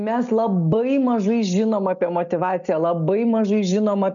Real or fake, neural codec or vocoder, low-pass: real; none; 7.2 kHz